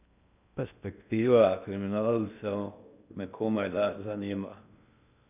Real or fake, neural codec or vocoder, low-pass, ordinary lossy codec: fake; codec, 16 kHz in and 24 kHz out, 0.6 kbps, FocalCodec, streaming, 2048 codes; 3.6 kHz; none